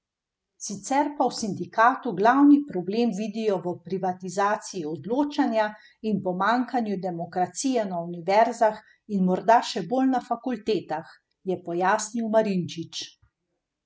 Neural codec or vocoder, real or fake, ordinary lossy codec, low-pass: none; real; none; none